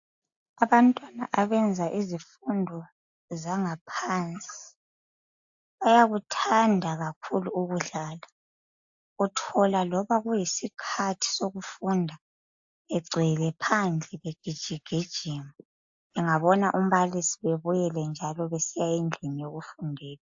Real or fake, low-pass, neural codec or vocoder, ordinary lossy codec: real; 7.2 kHz; none; AAC, 64 kbps